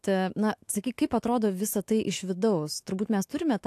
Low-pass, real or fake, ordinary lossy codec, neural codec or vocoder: 14.4 kHz; fake; AAC, 64 kbps; autoencoder, 48 kHz, 128 numbers a frame, DAC-VAE, trained on Japanese speech